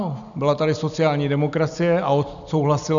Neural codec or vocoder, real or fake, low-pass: none; real; 7.2 kHz